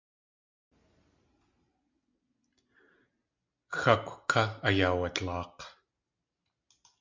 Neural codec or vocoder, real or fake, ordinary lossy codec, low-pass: none; real; MP3, 64 kbps; 7.2 kHz